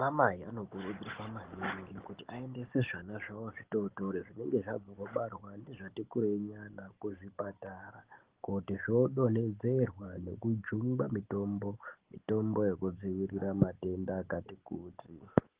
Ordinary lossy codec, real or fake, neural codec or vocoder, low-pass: Opus, 16 kbps; real; none; 3.6 kHz